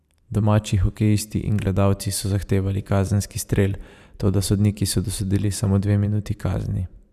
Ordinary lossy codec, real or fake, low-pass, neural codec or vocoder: none; fake; 14.4 kHz; vocoder, 44.1 kHz, 128 mel bands every 512 samples, BigVGAN v2